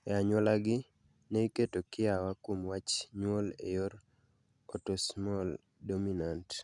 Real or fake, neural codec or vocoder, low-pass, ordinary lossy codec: real; none; 10.8 kHz; none